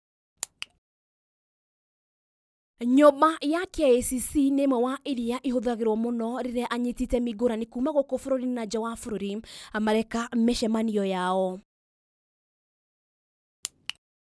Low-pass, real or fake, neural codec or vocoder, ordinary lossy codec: none; real; none; none